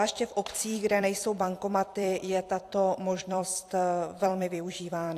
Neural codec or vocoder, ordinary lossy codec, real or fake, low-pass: vocoder, 44.1 kHz, 128 mel bands every 256 samples, BigVGAN v2; AAC, 64 kbps; fake; 14.4 kHz